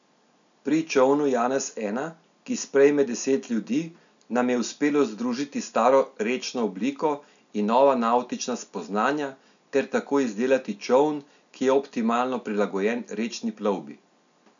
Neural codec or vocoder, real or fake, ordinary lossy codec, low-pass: none; real; none; 7.2 kHz